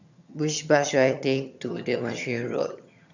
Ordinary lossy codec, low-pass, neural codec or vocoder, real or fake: none; 7.2 kHz; vocoder, 22.05 kHz, 80 mel bands, HiFi-GAN; fake